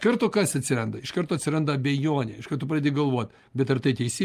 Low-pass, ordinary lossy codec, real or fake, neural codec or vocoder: 14.4 kHz; Opus, 32 kbps; real; none